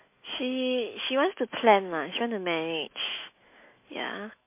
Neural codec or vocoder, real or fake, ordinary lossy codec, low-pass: autoencoder, 48 kHz, 128 numbers a frame, DAC-VAE, trained on Japanese speech; fake; MP3, 24 kbps; 3.6 kHz